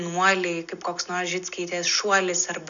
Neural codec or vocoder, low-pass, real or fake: none; 7.2 kHz; real